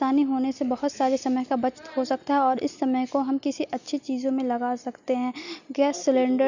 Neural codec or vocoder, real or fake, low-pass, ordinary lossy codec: none; real; 7.2 kHz; none